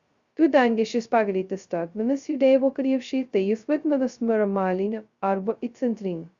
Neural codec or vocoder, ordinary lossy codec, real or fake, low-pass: codec, 16 kHz, 0.2 kbps, FocalCodec; Opus, 64 kbps; fake; 7.2 kHz